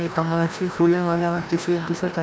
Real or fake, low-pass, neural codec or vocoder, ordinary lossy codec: fake; none; codec, 16 kHz, 1 kbps, FreqCodec, larger model; none